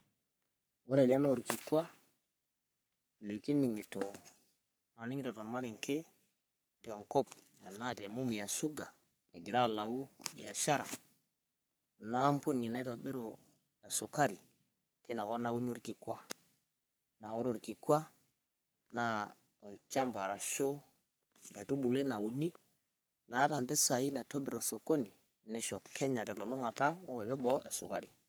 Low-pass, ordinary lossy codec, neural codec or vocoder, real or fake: none; none; codec, 44.1 kHz, 3.4 kbps, Pupu-Codec; fake